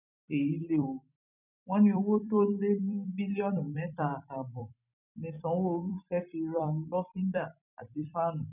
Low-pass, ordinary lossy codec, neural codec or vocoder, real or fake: 3.6 kHz; none; vocoder, 44.1 kHz, 128 mel bands every 256 samples, BigVGAN v2; fake